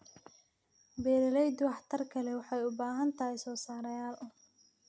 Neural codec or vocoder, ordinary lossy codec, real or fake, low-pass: none; none; real; none